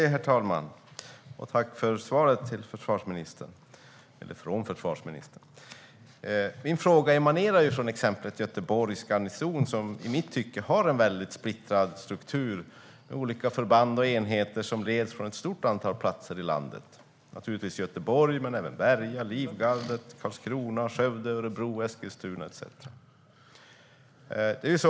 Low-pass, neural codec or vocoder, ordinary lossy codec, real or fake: none; none; none; real